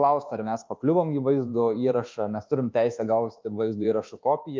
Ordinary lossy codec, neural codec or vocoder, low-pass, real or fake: Opus, 32 kbps; codec, 24 kHz, 1.2 kbps, DualCodec; 7.2 kHz; fake